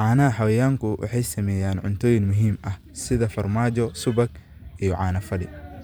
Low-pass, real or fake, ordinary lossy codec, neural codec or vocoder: none; fake; none; vocoder, 44.1 kHz, 128 mel bands every 512 samples, BigVGAN v2